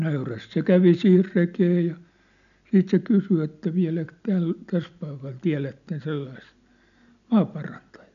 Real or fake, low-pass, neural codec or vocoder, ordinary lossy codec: real; 7.2 kHz; none; none